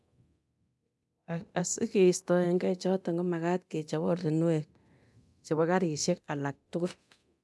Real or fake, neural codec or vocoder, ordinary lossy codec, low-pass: fake; codec, 24 kHz, 0.9 kbps, DualCodec; none; none